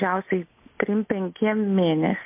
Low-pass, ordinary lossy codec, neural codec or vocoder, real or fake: 3.6 kHz; MP3, 24 kbps; none; real